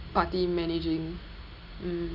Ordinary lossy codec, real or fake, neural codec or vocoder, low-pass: none; real; none; 5.4 kHz